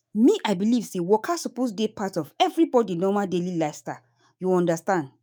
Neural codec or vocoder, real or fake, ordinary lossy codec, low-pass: autoencoder, 48 kHz, 128 numbers a frame, DAC-VAE, trained on Japanese speech; fake; none; none